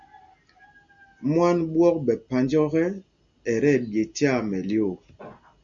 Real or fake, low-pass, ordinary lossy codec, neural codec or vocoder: real; 7.2 kHz; Opus, 64 kbps; none